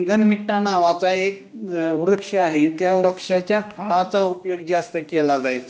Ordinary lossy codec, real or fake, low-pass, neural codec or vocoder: none; fake; none; codec, 16 kHz, 1 kbps, X-Codec, HuBERT features, trained on general audio